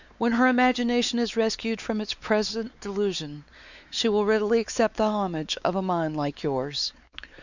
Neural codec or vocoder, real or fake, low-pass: codec, 16 kHz, 4 kbps, X-Codec, WavLM features, trained on Multilingual LibriSpeech; fake; 7.2 kHz